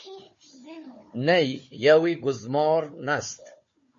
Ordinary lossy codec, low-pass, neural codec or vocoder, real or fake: MP3, 32 kbps; 7.2 kHz; codec, 16 kHz, 4 kbps, FunCodec, trained on Chinese and English, 50 frames a second; fake